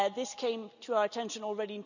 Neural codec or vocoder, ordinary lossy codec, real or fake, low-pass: none; none; real; 7.2 kHz